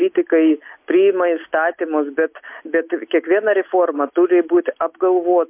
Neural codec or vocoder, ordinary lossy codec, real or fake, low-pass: none; MP3, 32 kbps; real; 3.6 kHz